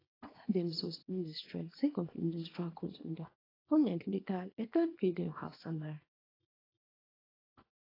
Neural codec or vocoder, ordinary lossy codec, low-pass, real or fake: codec, 24 kHz, 0.9 kbps, WavTokenizer, small release; AAC, 24 kbps; 5.4 kHz; fake